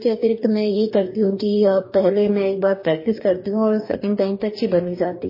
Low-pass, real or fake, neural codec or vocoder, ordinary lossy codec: 5.4 kHz; fake; codec, 44.1 kHz, 2.6 kbps, DAC; MP3, 24 kbps